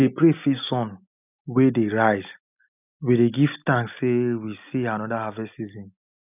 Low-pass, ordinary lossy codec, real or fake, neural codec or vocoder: 3.6 kHz; none; real; none